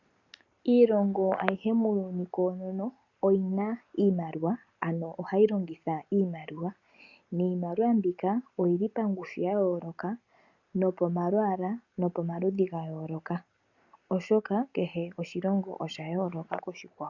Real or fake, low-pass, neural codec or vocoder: real; 7.2 kHz; none